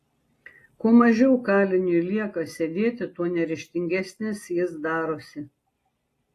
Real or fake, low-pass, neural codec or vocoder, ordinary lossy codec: real; 14.4 kHz; none; AAC, 48 kbps